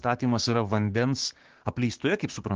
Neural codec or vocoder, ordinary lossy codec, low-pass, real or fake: codec, 16 kHz, 6 kbps, DAC; Opus, 16 kbps; 7.2 kHz; fake